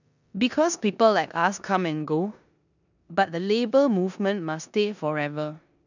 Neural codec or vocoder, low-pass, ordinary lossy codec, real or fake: codec, 16 kHz in and 24 kHz out, 0.9 kbps, LongCat-Audio-Codec, four codebook decoder; 7.2 kHz; none; fake